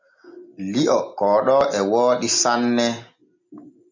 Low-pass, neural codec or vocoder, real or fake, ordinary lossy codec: 7.2 kHz; none; real; MP3, 48 kbps